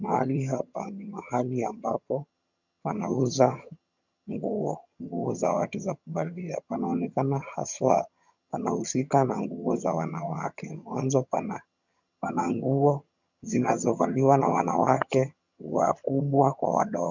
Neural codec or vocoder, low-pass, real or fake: vocoder, 22.05 kHz, 80 mel bands, HiFi-GAN; 7.2 kHz; fake